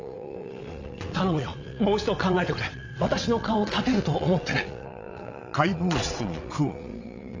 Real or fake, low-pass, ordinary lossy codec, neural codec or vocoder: fake; 7.2 kHz; AAC, 48 kbps; vocoder, 22.05 kHz, 80 mel bands, WaveNeXt